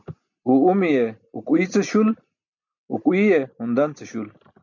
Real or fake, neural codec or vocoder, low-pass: real; none; 7.2 kHz